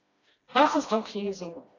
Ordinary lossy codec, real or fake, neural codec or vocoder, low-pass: AAC, 32 kbps; fake; codec, 16 kHz, 1 kbps, FreqCodec, smaller model; 7.2 kHz